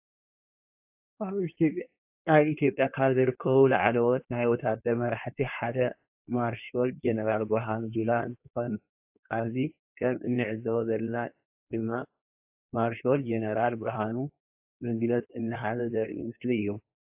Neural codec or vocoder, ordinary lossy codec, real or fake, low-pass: codec, 16 kHz in and 24 kHz out, 1.1 kbps, FireRedTTS-2 codec; AAC, 32 kbps; fake; 3.6 kHz